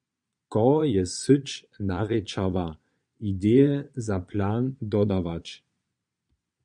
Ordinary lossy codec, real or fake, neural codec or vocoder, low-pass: MP3, 96 kbps; fake; vocoder, 22.05 kHz, 80 mel bands, Vocos; 9.9 kHz